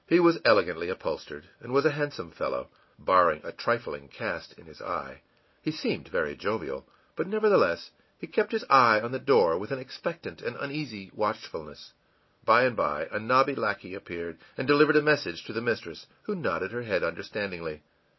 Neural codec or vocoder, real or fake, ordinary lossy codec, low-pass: none; real; MP3, 24 kbps; 7.2 kHz